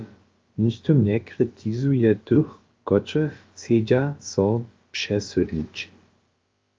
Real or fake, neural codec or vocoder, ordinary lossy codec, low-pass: fake; codec, 16 kHz, about 1 kbps, DyCAST, with the encoder's durations; Opus, 32 kbps; 7.2 kHz